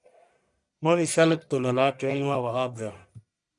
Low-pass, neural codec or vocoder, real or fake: 10.8 kHz; codec, 44.1 kHz, 1.7 kbps, Pupu-Codec; fake